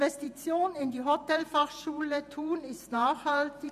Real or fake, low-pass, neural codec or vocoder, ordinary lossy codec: fake; 14.4 kHz; vocoder, 48 kHz, 128 mel bands, Vocos; MP3, 96 kbps